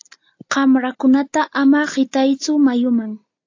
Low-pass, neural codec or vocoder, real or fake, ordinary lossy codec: 7.2 kHz; none; real; AAC, 48 kbps